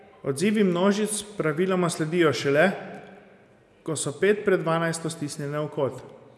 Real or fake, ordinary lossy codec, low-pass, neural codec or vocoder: real; none; none; none